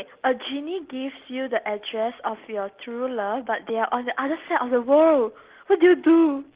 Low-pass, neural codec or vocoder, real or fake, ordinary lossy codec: 3.6 kHz; none; real; Opus, 16 kbps